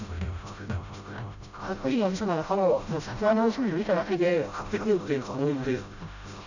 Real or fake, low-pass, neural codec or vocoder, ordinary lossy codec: fake; 7.2 kHz; codec, 16 kHz, 0.5 kbps, FreqCodec, smaller model; none